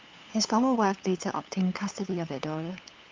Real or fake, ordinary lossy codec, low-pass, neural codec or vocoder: fake; Opus, 32 kbps; 7.2 kHz; codec, 16 kHz, 8 kbps, FunCodec, trained on LibriTTS, 25 frames a second